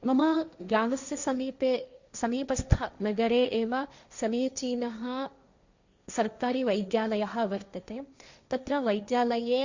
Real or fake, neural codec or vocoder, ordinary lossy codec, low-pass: fake; codec, 16 kHz, 1.1 kbps, Voila-Tokenizer; Opus, 64 kbps; 7.2 kHz